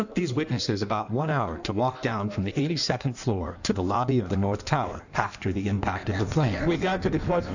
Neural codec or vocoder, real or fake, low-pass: codec, 16 kHz in and 24 kHz out, 1.1 kbps, FireRedTTS-2 codec; fake; 7.2 kHz